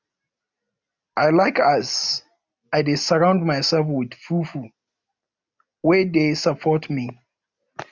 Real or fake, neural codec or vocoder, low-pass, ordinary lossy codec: real; none; 7.2 kHz; none